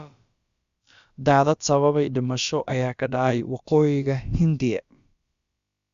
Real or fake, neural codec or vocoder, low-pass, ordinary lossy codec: fake; codec, 16 kHz, about 1 kbps, DyCAST, with the encoder's durations; 7.2 kHz; Opus, 64 kbps